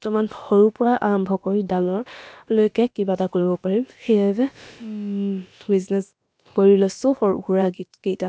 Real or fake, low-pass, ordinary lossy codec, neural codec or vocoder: fake; none; none; codec, 16 kHz, about 1 kbps, DyCAST, with the encoder's durations